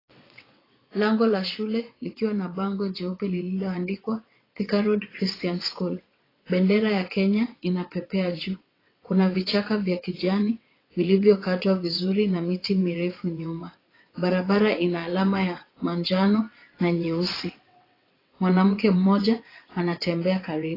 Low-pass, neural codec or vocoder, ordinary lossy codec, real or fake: 5.4 kHz; vocoder, 22.05 kHz, 80 mel bands, WaveNeXt; AAC, 24 kbps; fake